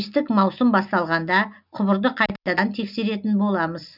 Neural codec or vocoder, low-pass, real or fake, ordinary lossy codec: none; 5.4 kHz; real; none